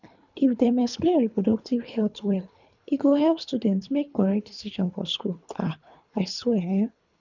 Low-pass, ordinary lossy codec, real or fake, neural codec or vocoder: 7.2 kHz; none; fake; codec, 24 kHz, 3 kbps, HILCodec